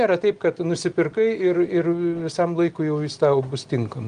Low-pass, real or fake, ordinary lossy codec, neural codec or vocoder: 9.9 kHz; real; Opus, 24 kbps; none